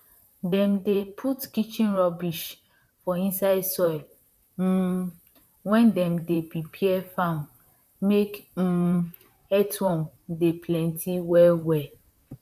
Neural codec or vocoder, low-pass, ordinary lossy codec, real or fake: vocoder, 44.1 kHz, 128 mel bands, Pupu-Vocoder; 14.4 kHz; none; fake